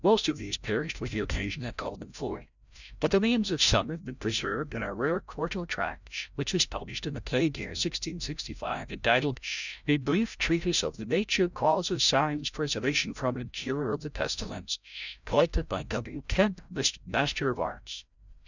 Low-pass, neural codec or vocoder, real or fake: 7.2 kHz; codec, 16 kHz, 0.5 kbps, FreqCodec, larger model; fake